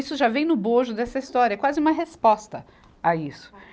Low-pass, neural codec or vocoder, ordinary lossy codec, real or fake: none; none; none; real